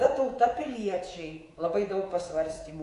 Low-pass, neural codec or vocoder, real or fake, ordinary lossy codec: 10.8 kHz; codec, 24 kHz, 3.1 kbps, DualCodec; fake; AAC, 48 kbps